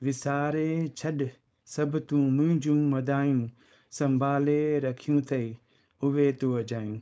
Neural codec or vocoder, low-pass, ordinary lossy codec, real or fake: codec, 16 kHz, 4.8 kbps, FACodec; none; none; fake